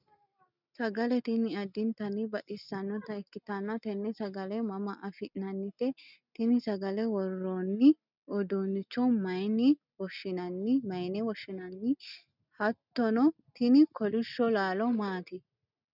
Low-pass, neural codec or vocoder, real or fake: 5.4 kHz; none; real